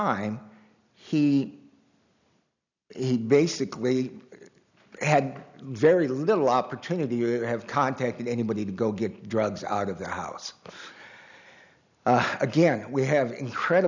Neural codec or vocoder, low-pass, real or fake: none; 7.2 kHz; real